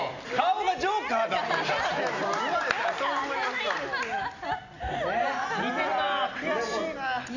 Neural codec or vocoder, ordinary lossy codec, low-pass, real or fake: none; none; 7.2 kHz; real